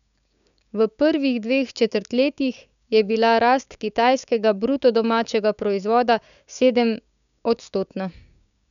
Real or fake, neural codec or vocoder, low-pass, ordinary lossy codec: fake; codec, 16 kHz, 6 kbps, DAC; 7.2 kHz; none